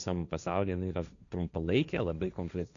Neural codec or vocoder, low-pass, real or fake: codec, 16 kHz, 1.1 kbps, Voila-Tokenizer; 7.2 kHz; fake